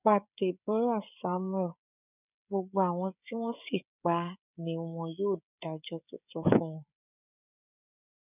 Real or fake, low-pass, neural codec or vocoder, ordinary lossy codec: fake; 3.6 kHz; codec, 16 kHz, 16 kbps, FreqCodec, smaller model; none